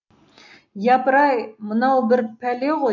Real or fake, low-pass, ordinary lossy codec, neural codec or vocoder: real; 7.2 kHz; none; none